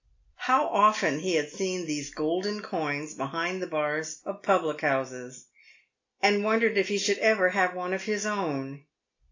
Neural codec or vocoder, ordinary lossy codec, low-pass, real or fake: none; AAC, 48 kbps; 7.2 kHz; real